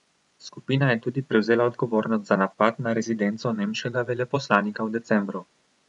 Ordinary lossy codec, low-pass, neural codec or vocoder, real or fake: none; 10.8 kHz; vocoder, 24 kHz, 100 mel bands, Vocos; fake